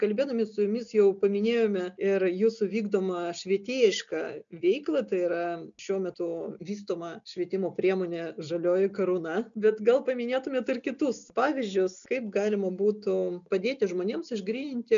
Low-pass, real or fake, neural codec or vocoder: 7.2 kHz; real; none